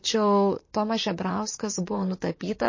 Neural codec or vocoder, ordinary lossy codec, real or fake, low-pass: vocoder, 44.1 kHz, 128 mel bands, Pupu-Vocoder; MP3, 32 kbps; fake; 7.2 kHz